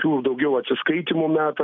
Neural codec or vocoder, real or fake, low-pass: none; real; 7.2 kHz